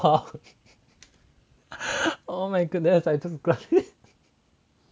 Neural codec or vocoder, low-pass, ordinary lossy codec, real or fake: none; none; none; real